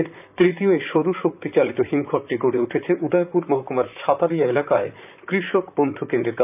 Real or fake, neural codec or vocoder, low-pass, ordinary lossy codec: fake; vocoder, 44.1 kHz, 128 mel bands, Pupu-Vocoder; 3.6 kHz; none